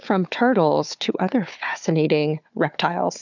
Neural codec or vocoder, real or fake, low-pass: codec, 16 kHz, 4 kbps, FunCodec, trained on Chinese and English, 50 frames a second; fake; 7.2 kHz